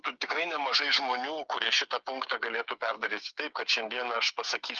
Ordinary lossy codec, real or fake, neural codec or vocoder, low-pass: Opus, 24 kbps; fake; codec, 16 kHz, 8 kbps, FreqCodec, smaller model; 7.2 kHz